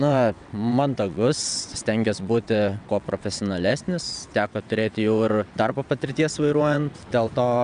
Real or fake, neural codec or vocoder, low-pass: fake; vocoder, 22.05 kHz, 80 mel bands, WaveNeXt; 9.9 kHz